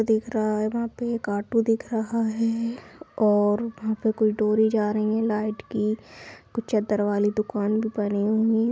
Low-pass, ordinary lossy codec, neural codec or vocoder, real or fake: none; none; none; real